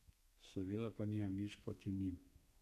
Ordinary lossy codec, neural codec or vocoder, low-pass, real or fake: none; codec, 32 kHz, 1.9 kbps, SNAC; 14.4 kHz; fake